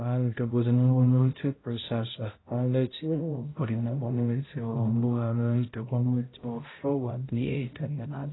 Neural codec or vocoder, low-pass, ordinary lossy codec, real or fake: codec, 16 kHz, 0.5 kbps, X-Codec, HuBERT features, trained on balanced general audio; 7.2 kHz; AAC, 16 kbps; fake